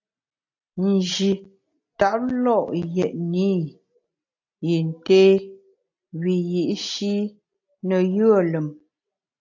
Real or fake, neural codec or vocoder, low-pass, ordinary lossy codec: real; none; 7.2 kHz; AAC, 48 kbps